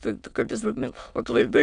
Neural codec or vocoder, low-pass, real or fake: autoencoder, 22.05 kHz, a latent of 192 numbers a frame, VITS, trained on many speakers; 9.9 kHz; fake